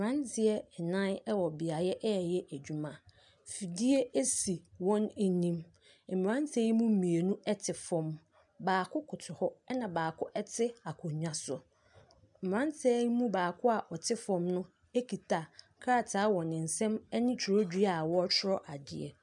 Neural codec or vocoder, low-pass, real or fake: none; 10.8 kHz; real